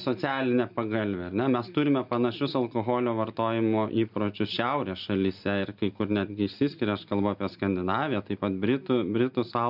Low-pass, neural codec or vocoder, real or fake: 5.4 kHz; none; real